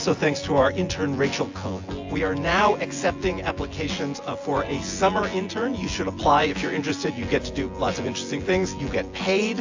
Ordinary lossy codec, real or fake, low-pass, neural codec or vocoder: AAC, 48 kbps; fake; 7.2 kHz; vocoder, 24 kHz, 100 mel bands, Vocos